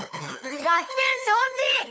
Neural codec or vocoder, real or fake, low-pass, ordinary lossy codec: codec, 16 kHz, 2 kbps, FunCodec, trained on LibriTTS, 25 frames a second; fake; none; none